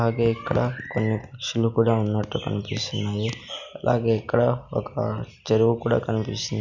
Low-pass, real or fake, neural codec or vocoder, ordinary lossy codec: 7.2 kHz; real; none; none